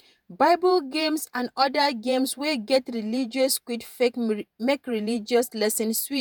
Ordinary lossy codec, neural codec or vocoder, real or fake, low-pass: none; vocoder, 48 kHz, 128 mel bands, Vocos; fake; none